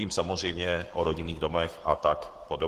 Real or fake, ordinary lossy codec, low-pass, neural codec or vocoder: fake; Opus, 16 kbps; 14.4 kHz; codec, 44.1 kHz, 7.8 kbps, Pupu-Codec